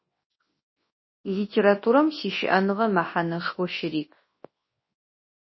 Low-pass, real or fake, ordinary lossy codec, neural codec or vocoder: 7.2 kHz; fake; MP3, 24 kbps; codec, 24 kHz, 0.9 kbps, WavTokenizer, large speech release